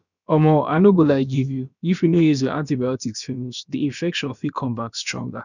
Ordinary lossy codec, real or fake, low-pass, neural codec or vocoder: none; fake; 7.2 kHz; codec, 16 kHz, about 1 kbps, DyCAST, with the encoder's durations